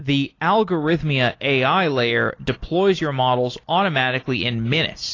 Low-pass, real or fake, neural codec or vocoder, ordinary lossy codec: 7.2 kHz; real; none; AAC, 32 kbps